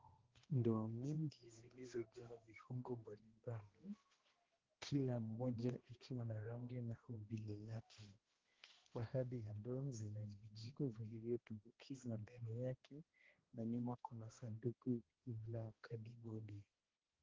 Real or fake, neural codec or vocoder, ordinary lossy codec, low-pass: fake; codec, 16 kHz, 1 kbps, X-Codec, HuBERT features, trained on balanced general audio; Opus, 16 kbps; 7.2 kHz